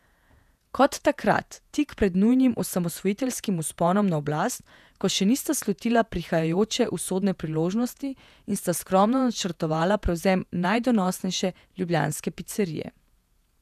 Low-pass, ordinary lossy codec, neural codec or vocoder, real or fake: 14.4 kHz; none; vocoder, 48 kHz, 128 mel bands, Vocos; fake